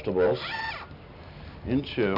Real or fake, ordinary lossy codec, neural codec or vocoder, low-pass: real; MP3, 48 kbps; none; 5.4 kHz